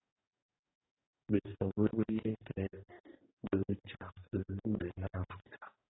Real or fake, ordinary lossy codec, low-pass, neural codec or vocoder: fake; AAC, 16 kbps; 7.2 kHz; codec, 44.1 kHz, 2.6 kbps, DAC